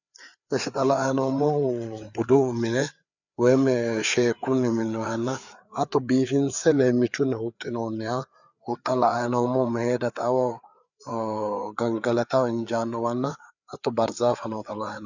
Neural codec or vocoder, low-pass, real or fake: codec, 16 kHz, 4 kbps, FreqCodec, larger model; 7.2 kHz; fake